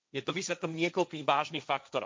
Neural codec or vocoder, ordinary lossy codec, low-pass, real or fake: codec, 16 kHz, 1.1 kbps, Voila-Tokenizer; none; none; fake